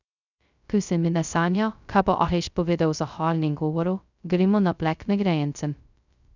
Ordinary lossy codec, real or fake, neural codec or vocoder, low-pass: none; fake; codec, 16 kHz, 0.2 kbps, FocalCodec; 7.2 kHz